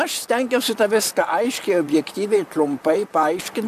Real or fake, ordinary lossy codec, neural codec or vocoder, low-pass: fake; AAC, 96 kbps; vocoder, 44.1 kHz, 128 mel bands, Pupu-Vocoder; 14.4 kHz